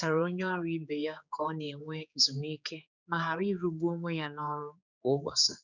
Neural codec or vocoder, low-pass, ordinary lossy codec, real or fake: codec, 16 kHz, 4 kbps, X-Codec, HuBERT features, trained on general audio; 7.2 kHz; none; fake